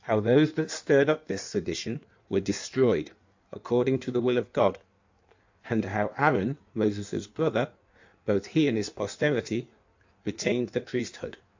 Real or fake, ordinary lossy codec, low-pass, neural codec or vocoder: fake; AAC, 48 kbps; 7.2 kHz; codec, 16 kHz in and 24 kHz out, 1.1 kbps, FireRedTTS-2 codec